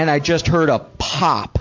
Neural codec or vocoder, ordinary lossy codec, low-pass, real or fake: none; MP3, 48 kbps; 7.2 kHz; real